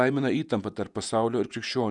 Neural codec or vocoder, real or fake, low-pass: vocoder, 48 kHz, 128 mel bands, Vocos; fake; 10.8 kHz